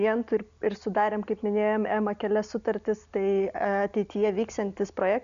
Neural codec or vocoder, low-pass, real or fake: none; 7.2 kHz; real